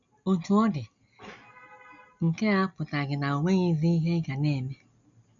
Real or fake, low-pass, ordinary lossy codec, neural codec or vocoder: real; 7.2 kHz; none; none